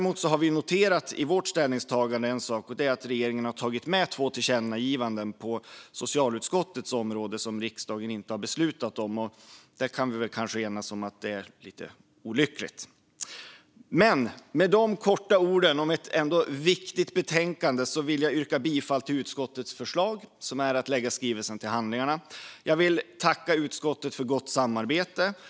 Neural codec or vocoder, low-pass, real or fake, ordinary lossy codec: none; none; real; none